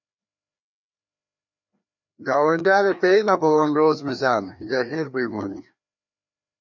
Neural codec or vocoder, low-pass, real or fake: codec, 16 kHz, 2 kbps, FreqCodec, larger model; 7.2 kHz; fake